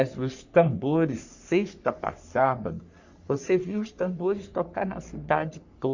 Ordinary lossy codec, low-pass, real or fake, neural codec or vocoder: Opus, 64 kbps; 7.2 kHz; fake; codec, 44.1 kHz, 3.4 kbps, Pupu-Codec